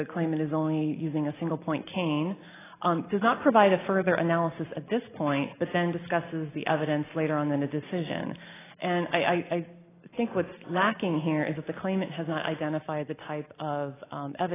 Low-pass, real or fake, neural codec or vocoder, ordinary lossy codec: 3.6 kHz; real; none; AAC, 16 kbps